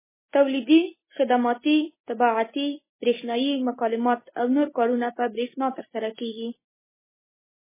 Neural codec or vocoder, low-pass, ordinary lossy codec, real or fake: none; 3.6 kHz; MP3, 16 kbps; real